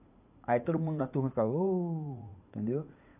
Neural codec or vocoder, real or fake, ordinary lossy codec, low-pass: vocoder, 44.1 kHz, 80 mel bands, Vocos; fake; AAC, 32 kbps; 3.6 kHz